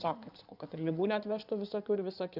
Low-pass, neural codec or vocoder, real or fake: 5.4 kHz; codec, 16 kHz in and 24 kHz out, 2.2 kbps, FireRedTTS-2 codec; fake